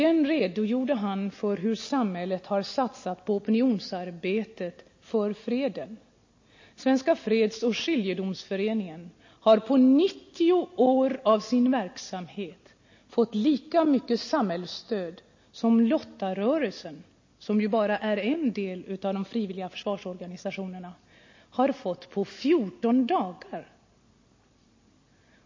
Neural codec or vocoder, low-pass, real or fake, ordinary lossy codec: none; 7.2 kHz; real; MP3, 32 kbps